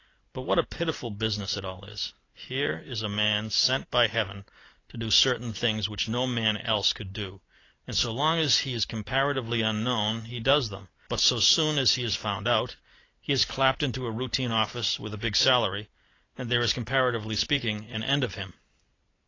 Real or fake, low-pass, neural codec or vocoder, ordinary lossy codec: real; 7.2 kHz; none; AAC, 32 kbps